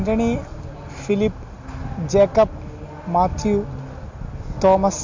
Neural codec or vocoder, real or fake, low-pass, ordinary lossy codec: none; real; 7.2 kHz; MP3, 48 kbps